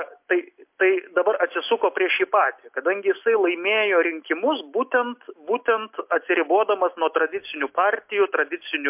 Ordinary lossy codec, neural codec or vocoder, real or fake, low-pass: MP3, 32 kbps; none; real; 3.6 kHz